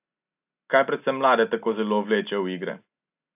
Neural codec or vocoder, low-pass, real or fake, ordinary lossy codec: none; 3.6 kHz; real; AAC, 32 kbps